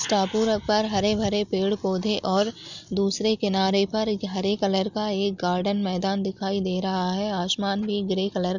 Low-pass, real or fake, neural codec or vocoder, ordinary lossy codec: 7.2 kHz; real; none; none